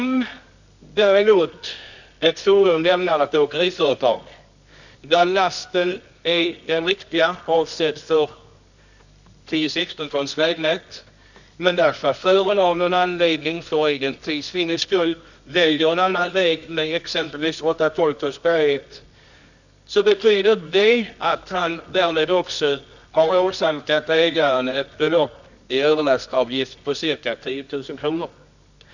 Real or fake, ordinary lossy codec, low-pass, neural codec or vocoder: fake; none; 7.2 kHz; codec, 24 kHz, 0.9 kbps, WavTokenizer, medium music audio release